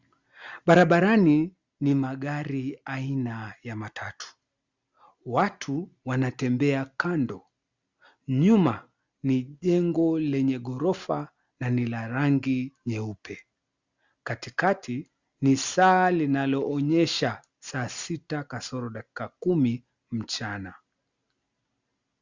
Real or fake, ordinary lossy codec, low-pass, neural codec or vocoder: real; Opus, 64 kbps; 7.2 kHz; none